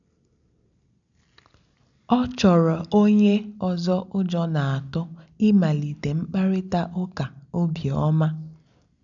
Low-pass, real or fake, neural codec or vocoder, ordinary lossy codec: 7.2 kHz; real; none; none